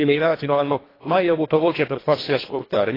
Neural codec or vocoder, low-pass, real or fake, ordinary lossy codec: codec, 24 kHz, 1.5 kbps, HILCodec; 5.4 kHz; fake; AAC, 24 kbps